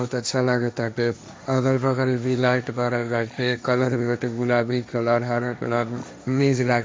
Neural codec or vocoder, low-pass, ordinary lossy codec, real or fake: codec, 16 kHz, 1.1 kbps, Voila-Tokenizer; none; none; fake